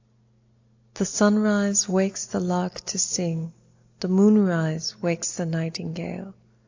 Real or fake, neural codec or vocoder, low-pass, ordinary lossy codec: real; none; 7.2 kHz; AAC, 48 kbps